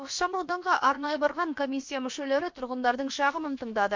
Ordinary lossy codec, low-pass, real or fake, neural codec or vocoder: MP3, 48 kbps; 7.2 kHz; fake; codec, 16 kHz, about 1 kbps, DyCAST, with the encoder's durations